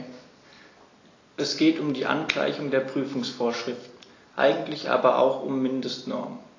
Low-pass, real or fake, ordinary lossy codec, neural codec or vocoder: 7.2 kHz; real; AAC, 32 kbps; none